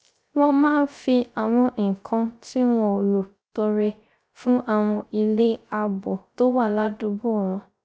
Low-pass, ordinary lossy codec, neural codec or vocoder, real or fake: none; none; codec, 16 kHz, 0.3 kbps, FocalCodec; fake